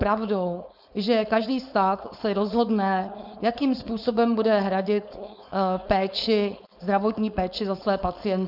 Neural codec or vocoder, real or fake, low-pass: codec, 16 kHz, 4.8 kbps, FACodec; fake; 5.4 kHz